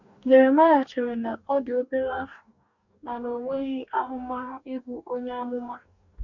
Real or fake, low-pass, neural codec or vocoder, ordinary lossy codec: fake; 7.2 kHz; codec, 44.1 kHz, 2.6 kbps, DAC; none